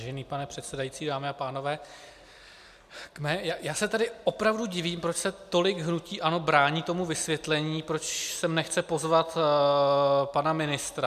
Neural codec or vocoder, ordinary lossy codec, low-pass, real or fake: none; MP3, 96 kbps; 14.4 kHz; real